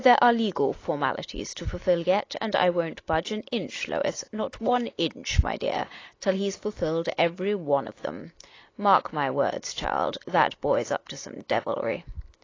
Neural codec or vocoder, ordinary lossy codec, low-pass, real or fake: none; AAC, 32 kbps; 7.2 kHz; real